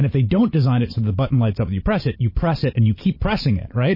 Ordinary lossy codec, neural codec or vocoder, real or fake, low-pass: MP3, 24 kbps; vocoder, 44.1 kHz, 128 mel bands every 512 samples, BigVGAN v2; fake; 5.4 kHz